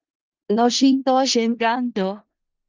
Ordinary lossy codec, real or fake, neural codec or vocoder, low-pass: Opus, 24 kbps; fake; codec, 16 kHz in and 24 kHz out, 0.4 kbps, LongCat-Audio-Codec, four codebook decoder; 7.2 kHz